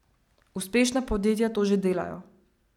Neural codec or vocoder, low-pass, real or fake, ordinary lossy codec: none; 19.8 kHz; real; none